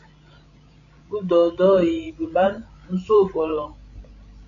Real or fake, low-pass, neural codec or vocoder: fake; 7.2 kHz; codec, 16 kHz, 16 kbps, FreqCodec, larger model